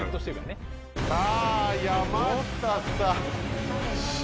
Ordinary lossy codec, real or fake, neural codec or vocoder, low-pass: none; real; none; none